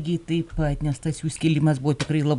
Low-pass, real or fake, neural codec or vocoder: 10.8 kHz; real; none